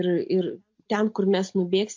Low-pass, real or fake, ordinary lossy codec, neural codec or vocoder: 7.2 kHz; real; MP3, 48 kbps; none